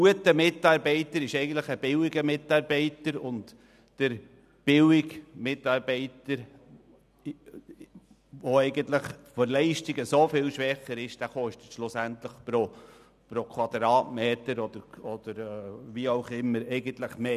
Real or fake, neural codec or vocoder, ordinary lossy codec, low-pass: real; none; none; 14.4 kHz